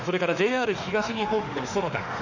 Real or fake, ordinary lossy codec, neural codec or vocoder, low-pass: fake; none; autoencoder, 48 kHz, 32 numbers a frame, DAC-VAE, trained on Japanese speech; 7.2 kHz